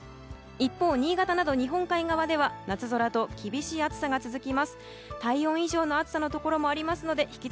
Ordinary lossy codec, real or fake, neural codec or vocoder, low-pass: none; real; none; none